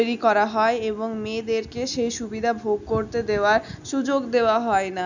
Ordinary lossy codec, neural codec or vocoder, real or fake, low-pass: none; none; real; 7.2 kHz